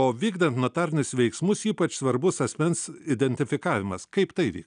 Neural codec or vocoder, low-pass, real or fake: none; 9.9 kHz; real